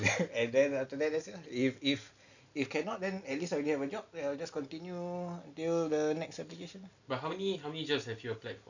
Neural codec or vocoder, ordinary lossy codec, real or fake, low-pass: none; none; real; 7.2 kHz